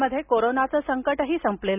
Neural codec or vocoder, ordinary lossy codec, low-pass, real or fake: none; none; 3.6 kHz; real